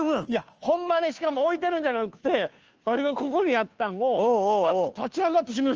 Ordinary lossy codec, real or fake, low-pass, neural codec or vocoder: Opus, 24 kbps; fake; 7.2 kHz; codec, 16 kHz, 2 kbps, FunCodec, trained on Chinese and English, 25 frames a second